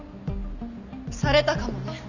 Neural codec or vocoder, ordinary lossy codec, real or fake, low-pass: none; none; real; 7.2 kHz